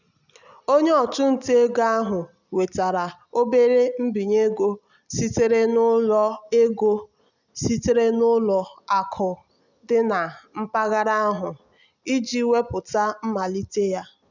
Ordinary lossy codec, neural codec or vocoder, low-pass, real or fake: none; none; 7.2 kHz; real